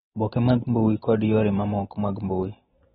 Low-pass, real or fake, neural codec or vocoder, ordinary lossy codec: 19.8 kHz; fake; vocoder, 44.1 kHz, 128 mel bands every 512 samples, BigVGAN v2; AAC, 16 kbps